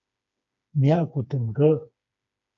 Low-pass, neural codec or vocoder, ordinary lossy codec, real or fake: 7.2 kHz; codec, 16 kHz, 4 kbps, FreqCodec, smaller model; AAC, 48 kbps; fake